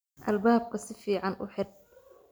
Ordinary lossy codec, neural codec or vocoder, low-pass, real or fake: none; none; none; real